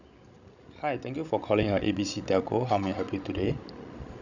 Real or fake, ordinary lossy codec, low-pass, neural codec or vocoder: fake; none; 7.2 kHz; codec, 16 kHz, 16 kbps, FreqCodec, larger model